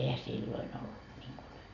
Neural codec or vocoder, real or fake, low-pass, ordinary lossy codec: none; real; 7.2 kHz; none